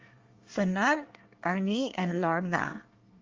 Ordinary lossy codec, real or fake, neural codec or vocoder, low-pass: Opus, 32 kbps; fake; codec, 24 kHz, 1 kbps, SNAC; 7.2 kHz